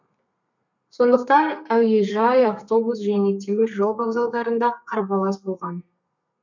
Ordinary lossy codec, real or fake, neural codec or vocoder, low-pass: none; fake; codec, 32 kHz, 1.9 kbps, SNAC; 7.2 kHz